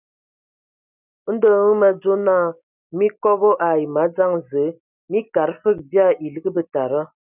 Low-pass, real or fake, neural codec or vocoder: 3.6 kHz; real; none